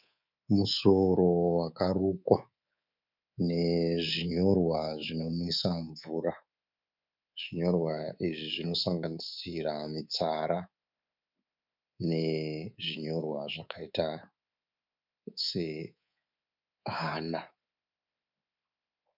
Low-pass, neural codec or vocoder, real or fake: 5.4 kHz; codec, 24 kHz, 3.1 kbps, DualCodec; fake